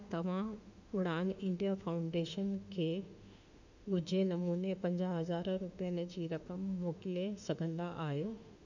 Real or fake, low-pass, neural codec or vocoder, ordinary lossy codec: fake; 7.2 kHz; autoencoder, 48 kHz, 32 numbers a frame, DAC-VAE, trained on Japanese speech; none